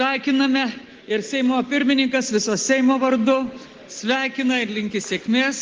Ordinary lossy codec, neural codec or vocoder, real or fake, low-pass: Opus, 16 kbps; none; real; 7.2 kHz